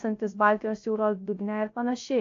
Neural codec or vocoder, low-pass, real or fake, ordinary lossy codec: codec, 16 kHz, 0.3 kbps, FocalCodec; 7.2 kHz; fake; AAC, 48 kbps